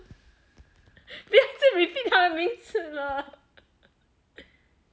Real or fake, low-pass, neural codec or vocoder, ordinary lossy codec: real; none; none; none